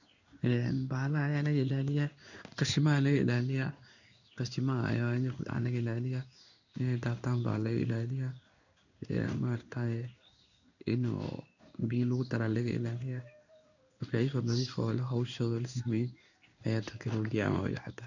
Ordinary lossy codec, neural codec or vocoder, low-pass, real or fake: none; codec, 16 kHz in and 24 kHz out, 1 kbps, XY-Tokenizer; 7.2 kHz; fake